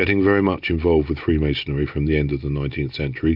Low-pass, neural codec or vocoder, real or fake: 5.4 kHz; none; real